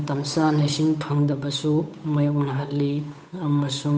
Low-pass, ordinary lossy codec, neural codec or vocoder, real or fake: none; none; codec, 16 kHz, 8 kbps, FunCodec, trained on Chinese and English, 25 frames a second; fake